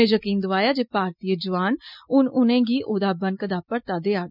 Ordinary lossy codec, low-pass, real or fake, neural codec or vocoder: none; 5.4 kHz; real; none